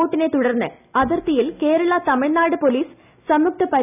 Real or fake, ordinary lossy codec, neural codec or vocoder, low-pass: real; none; none; 3.6 kHz